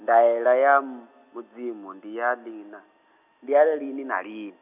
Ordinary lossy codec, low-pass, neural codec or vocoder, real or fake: none; 3.6 kHz; none; real